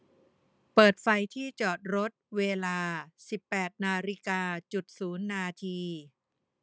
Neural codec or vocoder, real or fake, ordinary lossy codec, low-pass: none; real; none; none